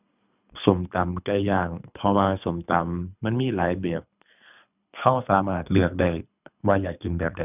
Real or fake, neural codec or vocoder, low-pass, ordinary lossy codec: fake; codec, 24 kHz, 3 kbps, HILCodec; 3.6 kHz; none